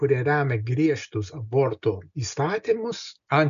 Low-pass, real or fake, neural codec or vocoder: 7.2 kHz; real; none